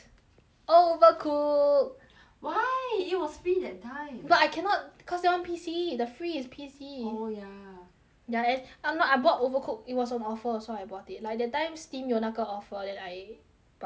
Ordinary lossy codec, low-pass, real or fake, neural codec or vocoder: none; none; real; none